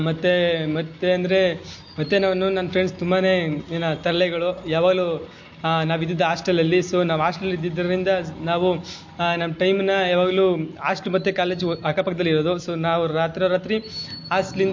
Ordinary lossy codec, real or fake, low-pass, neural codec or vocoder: MP3, 48 kbps; real; 7.2 kHz; none